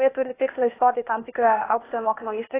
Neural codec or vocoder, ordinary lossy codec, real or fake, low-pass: codec, 16 kHz, 0.8 kbps, ZipCodec; AAC, 16 kbps; fake; 3.6 kHz